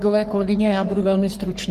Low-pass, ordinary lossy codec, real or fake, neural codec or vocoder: 14.4 kHz; Opus, 24 kbps; fake; codec, 44.1 kHz, 3.4 kbps, Pupu-Codec